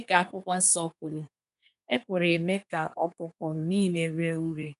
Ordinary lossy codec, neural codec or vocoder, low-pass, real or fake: none; codec, 24 kHz, 1 kbps, SNAC; 10.8 kHz; fake